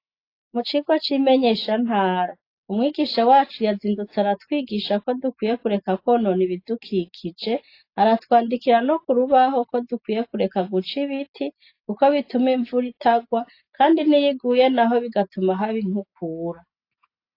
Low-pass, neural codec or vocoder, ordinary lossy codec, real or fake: 5.4 kHz; none; AAC, 32 kbps; real